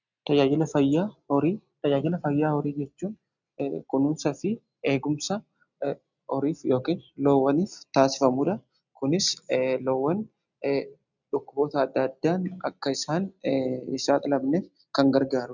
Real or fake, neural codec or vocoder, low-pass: real; none; 7.2 kHz